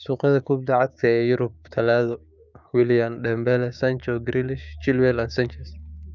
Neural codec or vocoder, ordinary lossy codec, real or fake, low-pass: codec, 16 kHz, 6 kbps, DAC; none; fake; 7.2 kHz